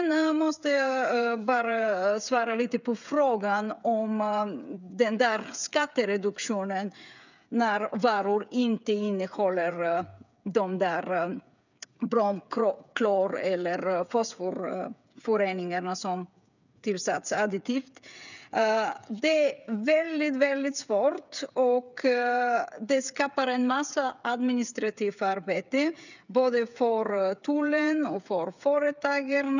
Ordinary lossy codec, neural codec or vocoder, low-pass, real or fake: none; codec, 16 kHz, 8 kbps, FreqCodec, smaller model; 7.2 kHz; fake